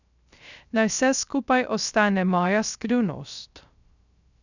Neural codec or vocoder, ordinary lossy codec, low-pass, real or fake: codec, 16 kHz, 0.3 kbps, FocalCodec; none; 7.2 kHz; fake